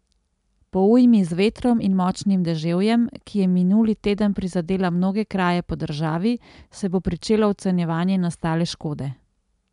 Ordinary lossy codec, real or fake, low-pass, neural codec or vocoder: MP3, 96 kbps; real; 10.8 kHz; none